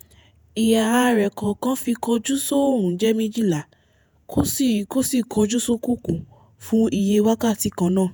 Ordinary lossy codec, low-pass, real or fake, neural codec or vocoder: none; none; fake; vocoder, 48 kHz, 128 mel bands, Vocos